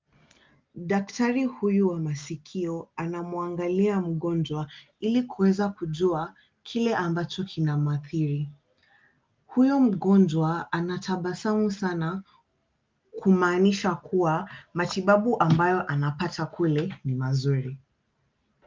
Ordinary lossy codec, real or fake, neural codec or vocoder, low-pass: Opus, 24 kbps; real; none; 7.2 kHz